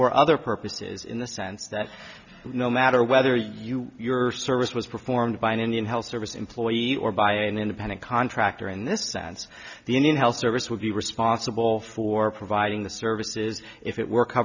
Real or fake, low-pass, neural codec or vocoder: real; 7.2 kHz; none